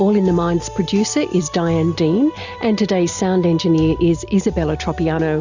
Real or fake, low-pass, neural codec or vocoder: real; 7.2 kHz; none